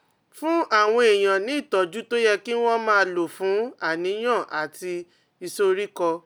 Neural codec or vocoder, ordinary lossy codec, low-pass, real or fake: none; none; none; real